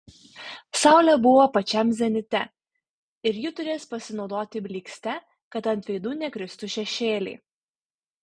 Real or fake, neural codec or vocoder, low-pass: real; none; 9.9 kHz